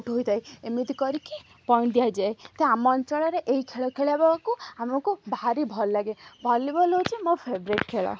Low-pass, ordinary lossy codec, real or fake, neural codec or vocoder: none; none; real; none